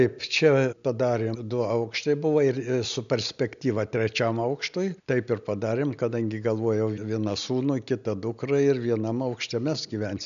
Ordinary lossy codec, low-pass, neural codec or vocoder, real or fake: MP3, 96 kbps; 7.2 kHz; none; real